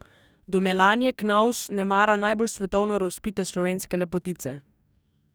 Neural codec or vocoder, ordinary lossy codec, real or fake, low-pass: codec, 44.1 kHz, 2.6 kbps, DAC; none; fake; none